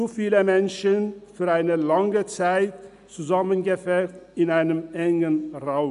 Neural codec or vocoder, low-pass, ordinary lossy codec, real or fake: none; 10.8 kHz; none; real